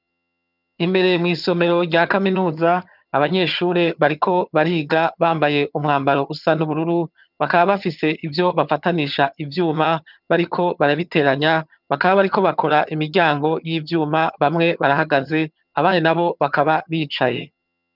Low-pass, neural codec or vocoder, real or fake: 5.4 kHz; vocoder, 22.05 kHz, 80 mel bands, HiFi-GAN; fake